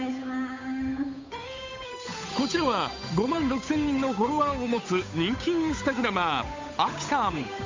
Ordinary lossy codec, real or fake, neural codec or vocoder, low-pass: MP3, 64 kbps; fake; codec, 16 kHz, 8 kbps, FunCodec, trained on Chinese and English, 25 frames a second; 7.2 kHz